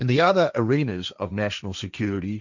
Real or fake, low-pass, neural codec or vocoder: fake; 7.2 kHz; codec, 16 kHz, 1.1 kbps, Voila-Tokenizer